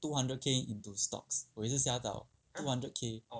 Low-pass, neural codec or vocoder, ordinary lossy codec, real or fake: none; none; none; real